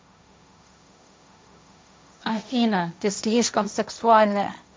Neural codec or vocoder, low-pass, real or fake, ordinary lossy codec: codec, 16 kHz, 1.1 kbps, Voila-Tokenizer; none; fake; none